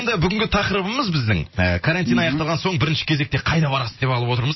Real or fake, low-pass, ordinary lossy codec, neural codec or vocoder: real; 7.2 kHz; MP3, 24 kbps; none